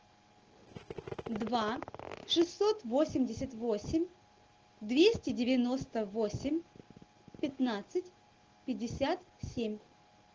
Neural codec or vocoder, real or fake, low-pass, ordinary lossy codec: none; real; 7.2 kHz; Opus, 16 kbps